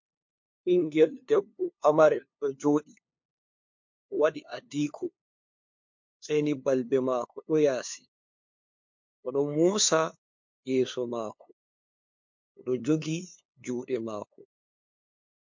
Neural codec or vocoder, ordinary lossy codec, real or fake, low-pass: codec, 16 kHz, 2 kbps, FunCodec, trained on LibriTTS, 25 frames a second; MP3, 48 kbps; fake; 7.2 kHz